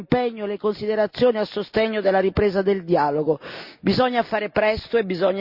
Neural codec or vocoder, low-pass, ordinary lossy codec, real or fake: none; 5.4 kHz; Opus, 64 kbps; real